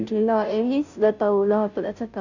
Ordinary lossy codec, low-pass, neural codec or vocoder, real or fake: none; 7.2 kHz; codec, 16 kHz, 0.5 kbps, FunCodec, trained on Chinese and English, 25 frames a second; fake